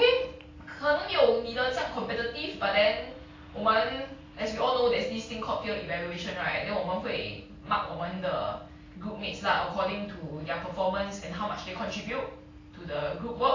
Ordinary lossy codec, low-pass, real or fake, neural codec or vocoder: AAC, 32 kbps; 7.2 kHz; real; none